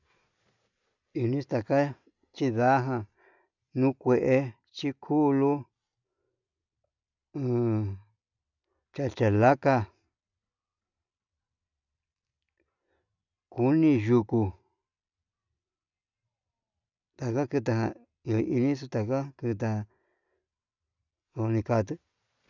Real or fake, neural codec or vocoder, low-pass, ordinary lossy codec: real; none; 7.2 kHz; none